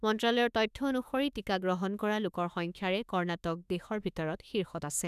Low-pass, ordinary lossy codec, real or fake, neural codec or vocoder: 14.4 kHz; none; fake; autoencoder, 48 kHz, 32 numbers a frame, DAC-VAE, trained on Japanese speech